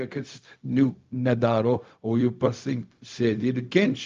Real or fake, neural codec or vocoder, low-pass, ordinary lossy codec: fake; codec, 16 kHz, 0.4 kbps, LongCat-Audio-Codec; 7.2 kHz; Opus, 32 kbps